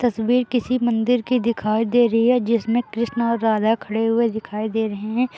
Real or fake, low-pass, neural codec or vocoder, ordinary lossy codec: real; none; none; none